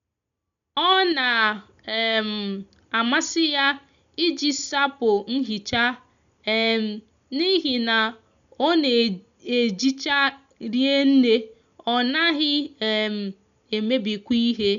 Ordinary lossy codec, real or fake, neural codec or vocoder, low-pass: none; real; none; 7.2 kHz